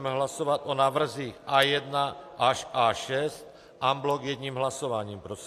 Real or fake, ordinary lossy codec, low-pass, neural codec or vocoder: real; AAC, 64 kbps; 14.4 kHz; none